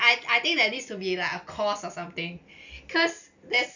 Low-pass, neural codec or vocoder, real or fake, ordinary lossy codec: 7.2 kHz; none; real; none